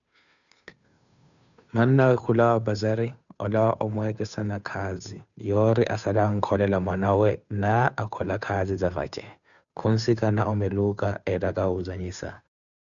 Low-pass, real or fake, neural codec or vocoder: 7.2 kHz; fake; codec, 16 kHz, 2 kbps, FunCodec, trained on Chinese and English, 25 frames a second